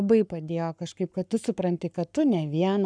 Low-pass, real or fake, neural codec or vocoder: 9.9 kHz; fake; codec, 44.1 kHz, 7.8 kbps, Pupu-Codec